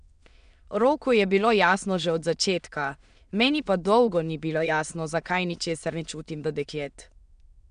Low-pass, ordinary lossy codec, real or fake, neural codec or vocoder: 9.9 kHz; none; fake; autoencoder, 22.05 kHz, a latent of 192 numbers a frame, VITS, trained on many speakers